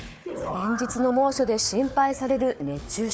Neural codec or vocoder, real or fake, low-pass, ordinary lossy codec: codec, 16 kHz, 4 kbps, FunCodec, trained on Chinese and English, 50 frames a second; fake; none; none